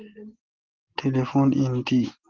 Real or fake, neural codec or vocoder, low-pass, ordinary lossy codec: real; none; 7.2 kHz; Opus, 16 kbps